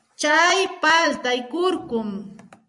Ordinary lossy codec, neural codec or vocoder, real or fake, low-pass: MP3, 96 kbps; vocoder, 44.1 kHz, 128 mel bands every 256 samples, BigVGAN v2; fake; 10.8 kHz